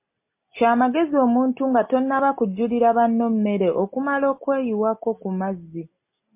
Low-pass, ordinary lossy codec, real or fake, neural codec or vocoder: 3.6 kHz; MP3, 24 kbps; real; none